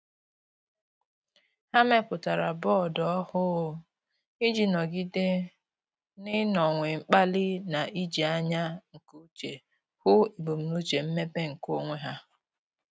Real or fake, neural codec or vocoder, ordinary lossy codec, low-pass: real; none; none; none